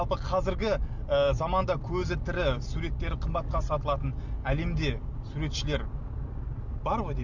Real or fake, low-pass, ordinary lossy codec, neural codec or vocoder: real; 7.2 kHz; none; none